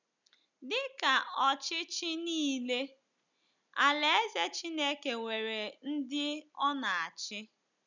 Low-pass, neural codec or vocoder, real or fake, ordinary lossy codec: 7.2 kHz; none; real; none